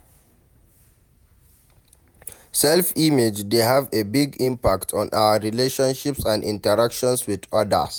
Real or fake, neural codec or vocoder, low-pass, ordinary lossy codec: fake; vocoder, 48 kHz, 128 mel bands, Vocos; none; none